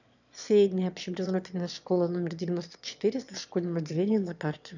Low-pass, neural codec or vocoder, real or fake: 7.2 kHz; autoencoder, 22.05 kHz, a latent of 192 numbers a frame, VITS, trained on one speaker; fake